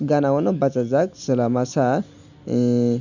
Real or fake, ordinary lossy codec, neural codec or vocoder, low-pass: real; none; none; 7.2 kHz